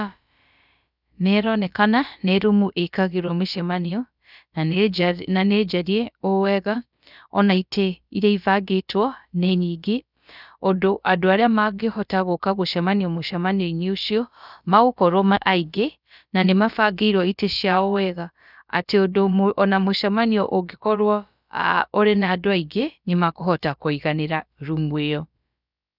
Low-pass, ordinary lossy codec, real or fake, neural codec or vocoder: 5.4 kHz; none; fake; codec, 16 kHz, about 1 kbps, DyCAST, with the encoder's durations